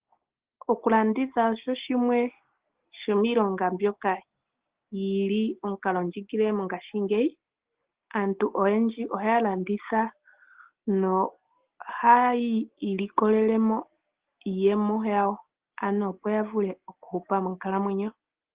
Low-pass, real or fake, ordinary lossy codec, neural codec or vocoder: 3.6 kHz; real; Opus, 16 kbps; none